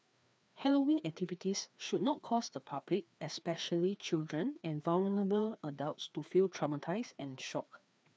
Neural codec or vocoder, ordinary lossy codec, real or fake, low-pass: codec, 16 kHz, 2 kbps, FreqCodec, larger model; none; fake; none